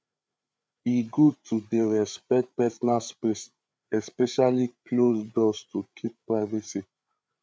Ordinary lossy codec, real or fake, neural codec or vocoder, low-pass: none; fake; codec, 16 kHz, 8 kbps, FreqCodec, larger model; none